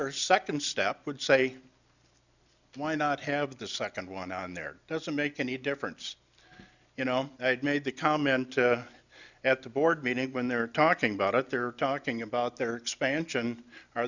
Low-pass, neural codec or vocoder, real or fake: 7.2 kHz; none; real